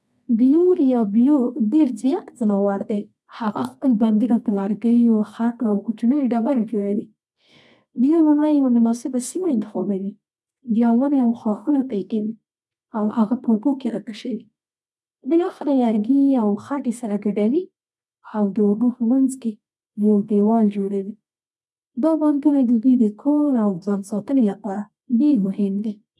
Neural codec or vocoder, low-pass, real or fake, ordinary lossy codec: codec, 24 kHz, 0.9 kbps, WavTokenizer, medium music audio release; none; fake; none